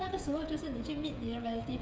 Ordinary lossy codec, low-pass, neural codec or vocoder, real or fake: none; none; codec, 16 kHz, 16 kbps, FreqCodec, smaller model; fake